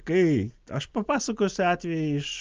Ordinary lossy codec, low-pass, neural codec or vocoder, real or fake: Opus, 24 kbps; 7.2 kHz; none; real